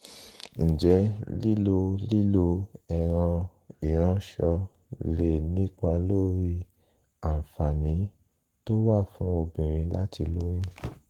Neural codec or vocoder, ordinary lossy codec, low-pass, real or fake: codec, 44.1 kHz, 7.8 kbps, Pupu-Codec; Opus, 24 kbps; 19.8 kHz; fake